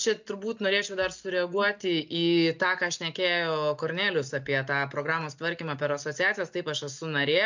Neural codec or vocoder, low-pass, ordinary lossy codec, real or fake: none; 7.2 kHz; MP3, 64 kbps; real